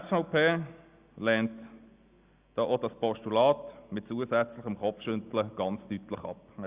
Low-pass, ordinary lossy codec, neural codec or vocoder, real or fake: 3.6 kHz; Opus, 64 kbps; none; real